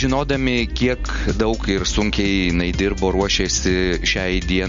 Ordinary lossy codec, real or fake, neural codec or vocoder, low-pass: MP3, 48 kbps; real; none; 7.2 kHz